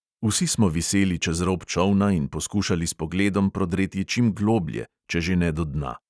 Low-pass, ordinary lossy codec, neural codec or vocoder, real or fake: none; none; none; real